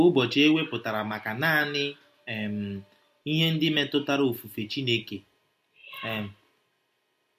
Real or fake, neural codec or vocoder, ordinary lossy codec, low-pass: real; none; MP3, 64 kbps; 14.4 kHz